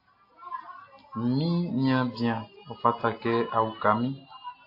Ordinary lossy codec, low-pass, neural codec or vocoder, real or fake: AAC, 32 kbps; 5.4 kHz; none; real